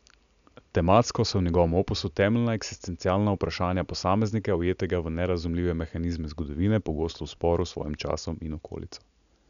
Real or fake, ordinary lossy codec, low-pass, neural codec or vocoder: real; none; 7.2 kHz; none